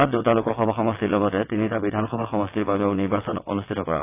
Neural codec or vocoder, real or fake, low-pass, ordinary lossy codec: vocoder, 22.05 kHz, 80 mel bands, WaveNeXt; fake; 3.6 kHz; AAC, 24 kbps